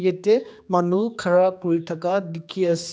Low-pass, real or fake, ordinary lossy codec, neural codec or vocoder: none; fake; none; codec, 16 kHz, 2 kbps, X-Codec, HuBERT features, trained on general audio